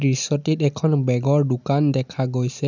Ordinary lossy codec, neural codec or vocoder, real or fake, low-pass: none; none; real; 7.2 kHz